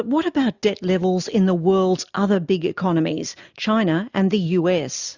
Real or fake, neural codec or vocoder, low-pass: real; none; 7.2 kHz